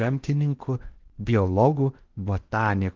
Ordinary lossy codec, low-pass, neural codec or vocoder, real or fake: Opus, 32 kbps; 7.2 kHz; codec, 16 kHz in and 24 kHz out, 0.8 kbps, FocalCodec, streaming, 65536 codes; fake